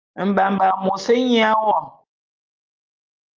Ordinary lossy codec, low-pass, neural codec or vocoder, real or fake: Opus, 24 kbps; 7.2 kHz; none; real